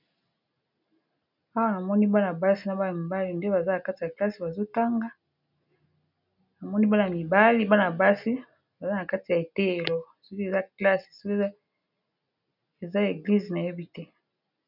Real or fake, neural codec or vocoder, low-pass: real; none; 5.4 kHz